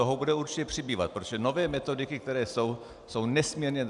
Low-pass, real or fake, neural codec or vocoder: 10.8 kHz; real; none